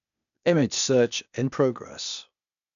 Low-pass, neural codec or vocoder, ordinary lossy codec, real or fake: 7.2 kHz; codec, 16 kHz, 0.8 kbps, ZipCodec; none; fake